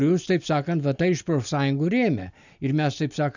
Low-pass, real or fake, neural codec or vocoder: 7.2 kHz; real; none